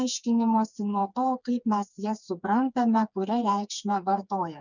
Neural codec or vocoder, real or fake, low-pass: codec, 16 kHz, 4 kbps, FreqCodec, smaller model; fake; 7.2 kHz